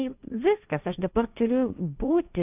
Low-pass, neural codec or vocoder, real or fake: 3.6 kHz; codec, 16 kHz, 1.1 kbps, Voila-Tokenizer; fake